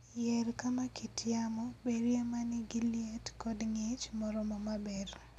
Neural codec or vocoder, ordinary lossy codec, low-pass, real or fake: none; none; 14.4 kHz; real